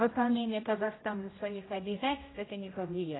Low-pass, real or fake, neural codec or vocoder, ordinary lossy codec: 7.2 kHz; fake; codec, 16 kHz, 0.5 kbps, X-Codec, HuBERT features, trained on general audio; AAC, 16 kbps